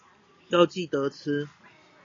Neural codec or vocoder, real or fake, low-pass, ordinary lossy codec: none; real; 7.2 kHz; AAC, 32 kbps